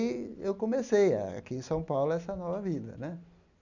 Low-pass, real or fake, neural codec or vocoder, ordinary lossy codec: 7.2 kHz; real; none; none